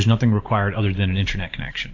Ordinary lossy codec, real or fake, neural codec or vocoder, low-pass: AAC, 48 kbps; fake; vocoder, 44.1 kHz, 128 mel bands every 512 samples, BigVGAN v2; 7.2 kHz